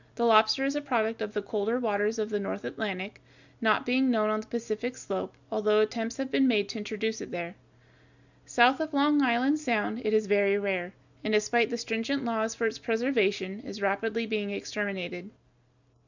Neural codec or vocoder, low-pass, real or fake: none; 7.2 kHz; real